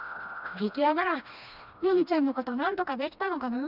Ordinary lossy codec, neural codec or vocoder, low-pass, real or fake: none; codec, 16 kHz, 1 kbps, FreqCodec, smaller model; 5.4 kHz; fake